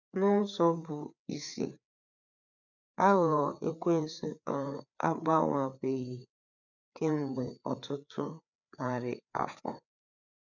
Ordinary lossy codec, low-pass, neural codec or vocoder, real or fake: none; 7.2 kHz; codec, 16 kHz, 4 kbps, FreqCodec, larger model; fake